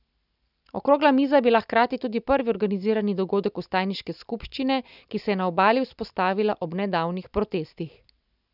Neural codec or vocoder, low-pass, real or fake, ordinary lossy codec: none; 5.4 kHz; real; none